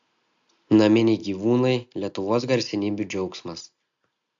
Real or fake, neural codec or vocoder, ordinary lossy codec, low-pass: real; none; AAC, 64 kbps; 7.2 kHz